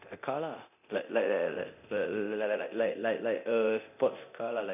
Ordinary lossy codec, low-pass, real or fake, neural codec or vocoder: none; 3.6 kHz; fake; codec, 24 kHz, 0.9 kbps, DualCodec